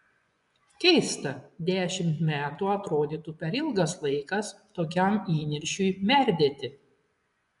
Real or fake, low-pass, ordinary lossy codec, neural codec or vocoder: fake; 10.8 kHz; MP3, 96 kbps; vocoder, 24 kHz, 100 mel bands, Vocos